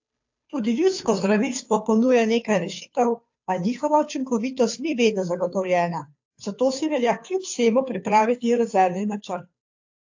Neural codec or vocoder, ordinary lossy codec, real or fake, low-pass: codec, 16 kHz, 2 kbps, FunCodec, trained on Chinese and English, 25 frames a second; AAC, 48 kbps; fake; 7.2 kHz